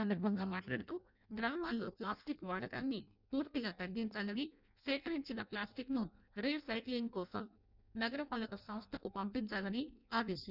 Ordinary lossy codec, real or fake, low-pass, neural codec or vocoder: none; fake; 5.4 kHz; codec, 16 kHz in and 24 kHz out, 0.6 kbps, FireRedTTS-2 codec